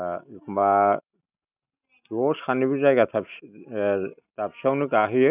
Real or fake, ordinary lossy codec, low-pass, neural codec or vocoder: real; none; 3.6 kHz; none